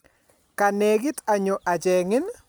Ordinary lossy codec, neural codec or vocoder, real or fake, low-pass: none; none; real; none